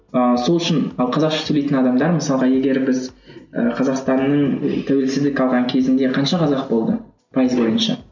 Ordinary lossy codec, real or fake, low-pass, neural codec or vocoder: none; real; 7.2 kHz; none